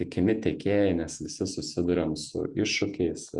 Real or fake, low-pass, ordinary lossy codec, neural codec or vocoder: fake; 10.8 kHz; Opus, 64 kbps; vocoder, 48 kHz, 128 mel bands, Vocos